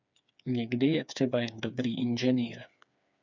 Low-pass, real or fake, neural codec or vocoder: 7.2 kHz; fake; codec, 16 kHz, 4 kbps, FreqCodec, smaller model